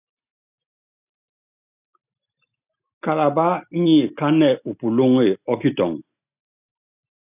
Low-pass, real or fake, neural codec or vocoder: 3.6 kHz; real; none